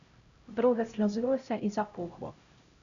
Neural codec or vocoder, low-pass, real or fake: codec, 16 kHz, 0.5 kbps, X-Codec, HuBERT features, trained on LibriSpeech; 7.2 kHz; fake